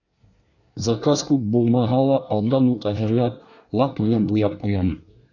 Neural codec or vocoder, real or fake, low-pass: codec, 24 kHz, 1 kbps, SNAC; fake; 7.2 kHz